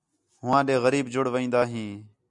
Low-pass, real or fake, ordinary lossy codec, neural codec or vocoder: 10.8 kHz; real; MP3, 96 kbps; none